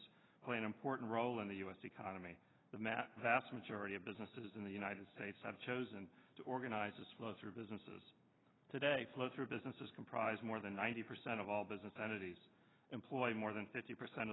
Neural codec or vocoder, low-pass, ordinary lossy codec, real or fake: none; 7.2 kHz; AAC, 16 kbps; real